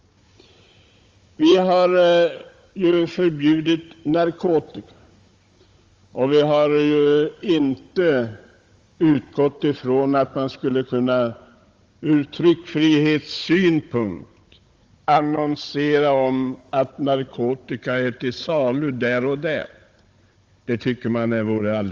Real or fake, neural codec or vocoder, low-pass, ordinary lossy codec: fake; codec, 16 kHz, 16 kbps, FunCodec, trained on Chinese and English, 50 frames a second; 7.2 kHz; Opus, 32 kbps